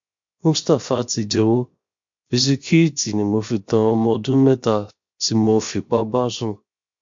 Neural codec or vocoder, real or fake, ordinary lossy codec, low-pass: codec, 16 kHz, 0.7 kbps, FocalCodec; fake; MP3, 48 kbps; 7.2 kHz